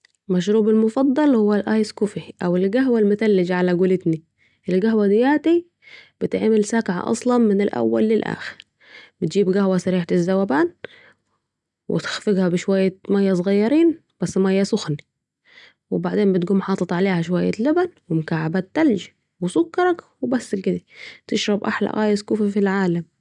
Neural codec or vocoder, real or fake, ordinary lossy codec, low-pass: none; real; none; 10.8 kHz